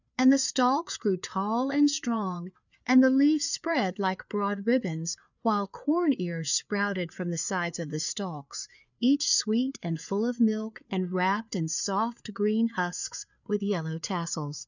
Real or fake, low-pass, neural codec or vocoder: fake; 7.2 kHz; codec, 16 kHz, 4 kbps, FreqCodec, larger model